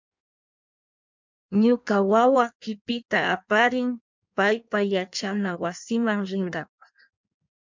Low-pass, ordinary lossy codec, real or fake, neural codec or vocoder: 7.2 kHz; MP3, 64 kbps; fake; codec, 16 kHz in and 24 kHz out, 1.1 kbps, FireRedTTS-2 codec